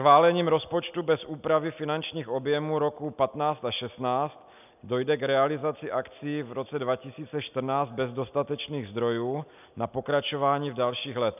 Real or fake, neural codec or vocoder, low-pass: real; none; 3.6 kHz